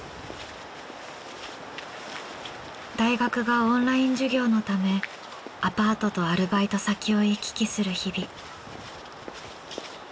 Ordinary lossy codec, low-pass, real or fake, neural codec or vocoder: none; none; real; none